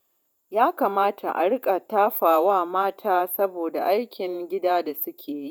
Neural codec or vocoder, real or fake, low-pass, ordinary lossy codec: vocoder, 48 kHz, 128 mel bands, Vocos; fake; 19.8 kHz; none